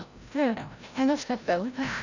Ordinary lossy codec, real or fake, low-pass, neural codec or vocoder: none; fake; 7.2 kHz; codec, 16 kHz, 0.5 kbps, FreqCodec, larger model